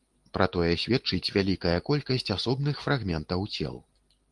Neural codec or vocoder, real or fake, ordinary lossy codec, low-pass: none; real; Opus, 24 kbps; 10.8 kHz